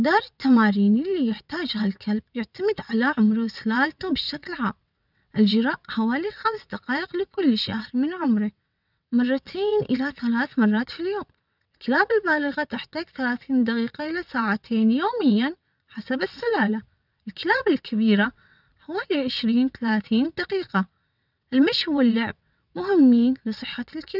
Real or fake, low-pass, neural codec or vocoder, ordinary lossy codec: fake; 5.4 kHz; vocoder, 22.05 kHz, 80 mel bands, WaveNeXt; none